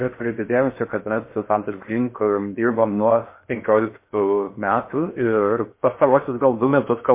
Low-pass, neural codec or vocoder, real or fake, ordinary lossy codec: 3.6 kHz; codec, 16 kHz in and 24 kHz out, 0.6 kbps, FocalCodec, streaming, 4096 codes; fake; MP3, 24 kbps